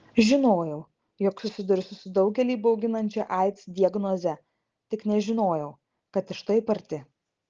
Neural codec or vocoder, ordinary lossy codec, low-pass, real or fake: none; Opus, 16 kbps; 7.2 kHz; real